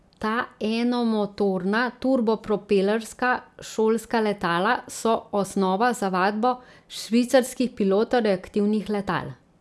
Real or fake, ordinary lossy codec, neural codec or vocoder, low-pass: real; none; none; none